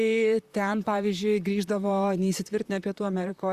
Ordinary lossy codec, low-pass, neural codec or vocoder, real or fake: Opus, 64 kbps; 14.4 kHz; vocoder, 44.1 kHz, 128 mel bands, Pupu-Vocoder; fake